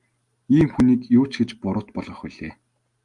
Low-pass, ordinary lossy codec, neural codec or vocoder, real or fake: 10.8 kHz; Opus, 32 kbps; none; real